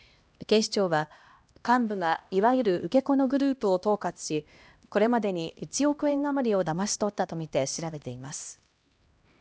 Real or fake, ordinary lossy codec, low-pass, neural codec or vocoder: fake; none; none; codec, 16 kHz, 1 kbps, X-Codec, HuBERT features, trained on LibriSpeech